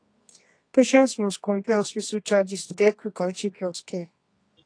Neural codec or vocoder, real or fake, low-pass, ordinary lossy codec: codec, 24 kHz, 0.9 kbps, WavTokenizer, medium music audio release; fake; 9.9 kHz; AAC, 48 kbps